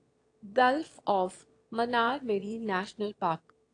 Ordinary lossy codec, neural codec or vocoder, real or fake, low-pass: AAC, 48 kbps; autoencoder, 22.05 kHz, a latent of 192 numbers a frame, VITS, trained on one speaker; fake; 9.9 kHz